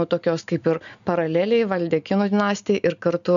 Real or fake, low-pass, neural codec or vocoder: real; 7.2 kHz; none